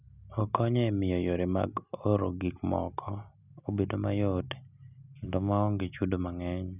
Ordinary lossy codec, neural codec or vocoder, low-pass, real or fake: none; none; 3.6 kHz; real